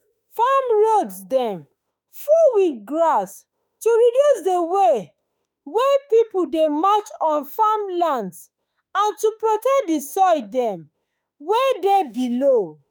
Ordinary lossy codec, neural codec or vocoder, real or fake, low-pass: none; autoencoder, 48 kHz, 32 numbers a frame, DAC-VAE, trained on Japanese speech; fake; none